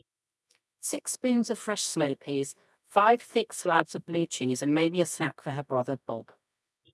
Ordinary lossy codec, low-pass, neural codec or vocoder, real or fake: none; none; codec, 24 kHz, 0.9 kbps, WavTokenizer, medium music audio release; fake